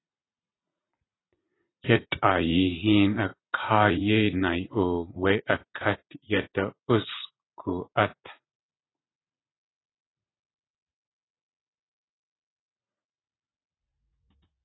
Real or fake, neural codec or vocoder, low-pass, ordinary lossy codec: fake; vocoder, 44.1 kHz, 80 mel bands, Vocos; 7.2 kHz; AAC, 16 kbps